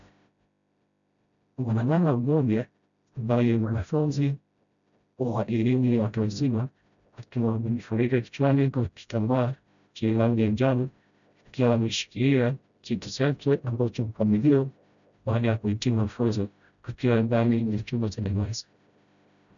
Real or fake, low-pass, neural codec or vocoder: fake; 7.2 kHz; codec, 16 kHz, 0.5 kbps, FreqCodec, smaller model